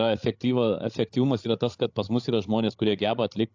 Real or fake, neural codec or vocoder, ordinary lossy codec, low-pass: fake; codec, 16 kHz, 16 kbps, FreqCodec, larger model; AAC, 48 kbps; 7.2 kHz